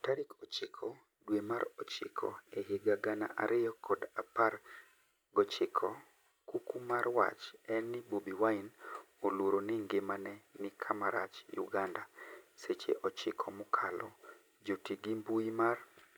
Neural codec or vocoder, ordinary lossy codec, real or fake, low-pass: none; none; real; none